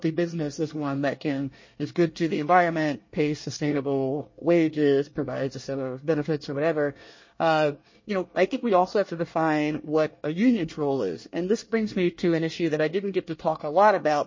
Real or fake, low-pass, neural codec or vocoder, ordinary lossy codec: fake; 7.2 kHz; codec, 24 kHz, 1 kbps, SNAC; MP3, 32 kbps